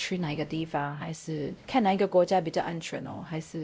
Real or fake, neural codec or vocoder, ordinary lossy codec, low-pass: fake; codec, 16 kHz, 0.5 kbps, X-Codec, WavLM features, trained on Multilingual LibriSpeech; none; none